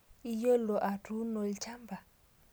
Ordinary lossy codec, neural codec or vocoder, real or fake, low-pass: none; none; real; none